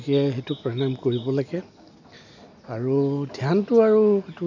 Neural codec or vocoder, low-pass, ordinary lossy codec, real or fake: none; 7.2 kHz; none; real